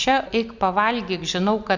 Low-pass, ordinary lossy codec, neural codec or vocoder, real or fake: 7.2 kHz; Opus, 64 kbps; none; real